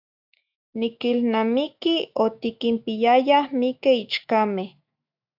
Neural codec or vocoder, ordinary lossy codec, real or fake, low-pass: autoencoder, 48 kHz, 128 numbers a frame, DAC-VAE, trained on Japanese speech; AAC, 48 kbps; fake; 5.4 kHz